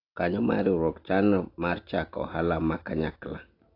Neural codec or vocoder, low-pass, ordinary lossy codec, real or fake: none; 5.4 kHz; AAC, 32 kbps; real